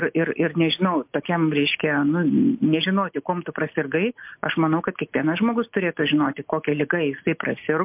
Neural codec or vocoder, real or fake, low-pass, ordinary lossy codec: none; real; 3.6 kHz; MP3, 32 kbps